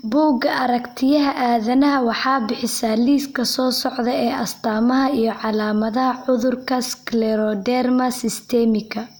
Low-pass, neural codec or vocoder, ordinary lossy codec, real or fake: none; none; none; real